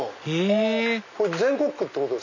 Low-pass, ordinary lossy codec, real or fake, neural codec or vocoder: 7.2 kHz; none; real; none